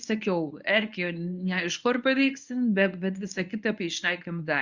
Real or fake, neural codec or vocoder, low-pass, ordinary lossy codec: fake; codec, 24 kHz, 0.9 kbps, WavTokenizer, medium speech release version 1; 7.2 kHz; Opus, 64 kbps